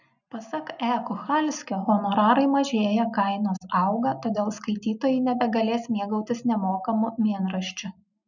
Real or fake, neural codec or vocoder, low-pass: real; none; 7.2 kHz